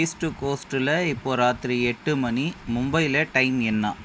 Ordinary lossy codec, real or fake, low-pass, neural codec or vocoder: none; real; none; none